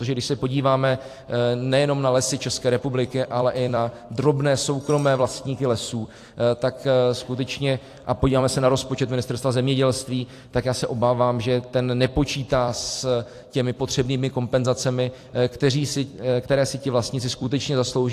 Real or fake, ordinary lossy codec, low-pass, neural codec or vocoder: fake; AAC, 64 kbps; 14.4 kHz; vocoder, 44.1 kHz, 128 mel bands every 256 samples, BigVGAN v2